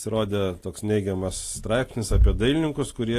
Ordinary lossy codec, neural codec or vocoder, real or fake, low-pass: AAC, 48 kbps; none; real; 14.4 kHz